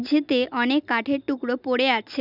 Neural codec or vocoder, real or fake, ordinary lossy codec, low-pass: none; real; none; 5.4 kHz